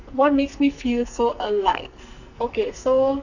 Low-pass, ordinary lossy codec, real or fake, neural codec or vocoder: 7.2 kHz; none; fake; codec, 44.1 kHz, 2.6 kbps, SNAC